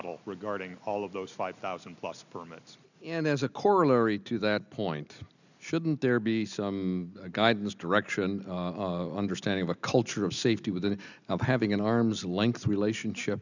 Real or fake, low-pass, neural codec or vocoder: real; 7.2 kHz; none